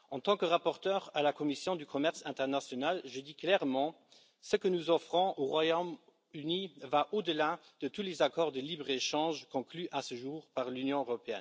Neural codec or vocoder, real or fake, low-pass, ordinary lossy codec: none; real; none; none